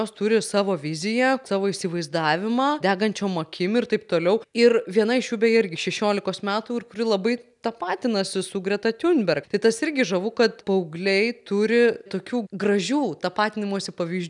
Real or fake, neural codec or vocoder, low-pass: real; none; 10.8 kHz